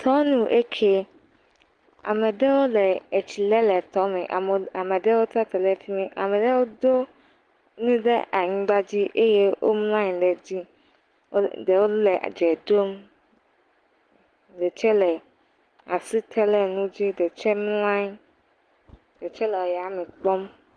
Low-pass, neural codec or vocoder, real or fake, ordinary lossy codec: 9.9 kHz; none; real; Opus, 24 kbps